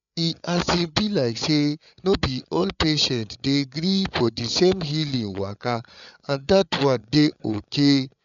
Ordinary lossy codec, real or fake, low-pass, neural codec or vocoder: none; fake; 7.2 kHz; codec, 16 kHz, 8 kbps, FreqCodec, larger model